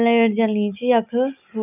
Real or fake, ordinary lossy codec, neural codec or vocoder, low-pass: real; none; none; 3.6 kHz